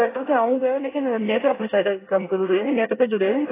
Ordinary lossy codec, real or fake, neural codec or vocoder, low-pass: AAC, 16 kbps; fake; codec, 24 kHz, 1 kbps, SNAC; 3.6 kHz